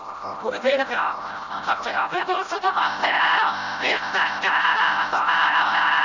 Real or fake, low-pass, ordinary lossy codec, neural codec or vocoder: fake; 7.2 kHz; none; codec, 16 kHz, 0.5 kbps, FreqCodec, smaller model